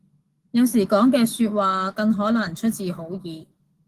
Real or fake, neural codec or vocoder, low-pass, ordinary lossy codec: fake; autoencoder, 48 kHz, 128 numbers a frame, DAC-VAE, trained on Japanese speech; 14.4 kHz; Opus, 16 kbps